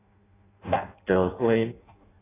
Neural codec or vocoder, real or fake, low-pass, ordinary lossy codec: codec, 16 kHz in and 24 kHz out, 0.6 kbps, FireRedTTS-2 codec; fake; 3.6 kHz; AAC, 16 kbps